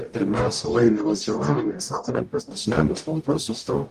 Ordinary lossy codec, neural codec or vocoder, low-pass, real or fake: Opus, 32 kbps; codec, 44.1 kHz, 0.9 kbps, DAC; 14.4 kHz; fake